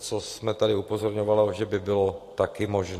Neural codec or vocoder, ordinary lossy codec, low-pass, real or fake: none; AAC, 48 kbps; 14.4 kHz; real